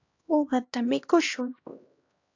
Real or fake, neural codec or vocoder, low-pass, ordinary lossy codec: fake; codec, 16 kHz, 1 kbps, X-Codec, HuBERT features, trained on LibriSpeech; 7.2 kHz; AAC, 48 kbps